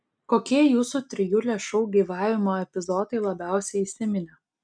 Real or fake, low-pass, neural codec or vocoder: real; 9.9 kHz; none